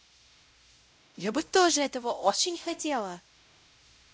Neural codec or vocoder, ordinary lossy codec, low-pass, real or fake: codec, 16 kHz, 0.5 kbps, X-Codec, WavLM features, trained on Multilingual LibriSpeech; none; none; fake